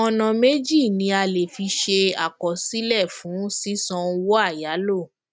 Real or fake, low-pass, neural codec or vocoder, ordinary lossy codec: real; none; none; none